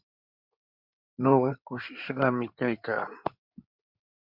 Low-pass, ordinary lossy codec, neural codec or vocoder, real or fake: 5.4 kHz; MP3, 48 kbps; codec, 16 kHz in and 24 kHz out, 2.2 kbps, FireRedTTS-2 codec; fake